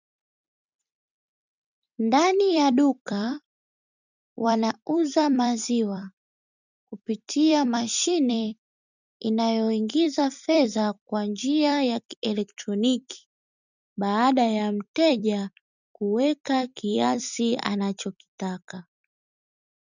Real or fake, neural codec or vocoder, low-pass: fake; vocoder, 44.1 kHz, 128 mel bands every 256 samples, BigVGAN v2; 7.2 kHz